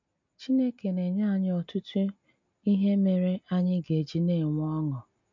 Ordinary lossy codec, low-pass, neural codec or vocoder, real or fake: MP3, 64 kbps; 7.2 kHz; none; real